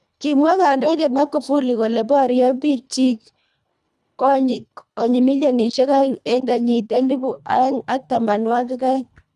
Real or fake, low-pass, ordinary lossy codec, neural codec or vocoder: fake; none; none; codec, 24 kHz, 1.5 kbps, HILCodec